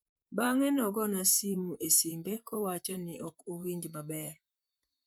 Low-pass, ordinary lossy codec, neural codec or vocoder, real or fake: none; none; vocoder, 44.1 kHz, 128 mel bands, Pupu-Vocoder; fake